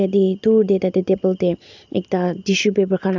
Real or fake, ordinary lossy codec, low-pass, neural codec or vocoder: real; none; 7.2 kHz; none